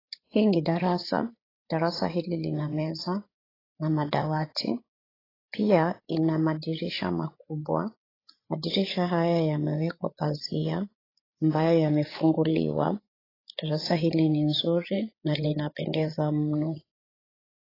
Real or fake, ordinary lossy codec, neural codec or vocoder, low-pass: fake; AAC, 24 kbps; codec, 16 kHz, 8 kbps, FreqCodec, larger model; 5.4 kHz